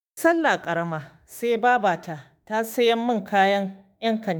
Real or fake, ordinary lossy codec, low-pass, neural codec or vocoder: fake; none; none; autoencoder, 48 kHz, 32 numbers a frame, DAC-VAE, trained on Japanese speech